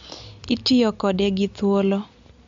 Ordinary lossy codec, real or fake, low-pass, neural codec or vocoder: MP3, 48 kbps; real; 7.2 kHz; none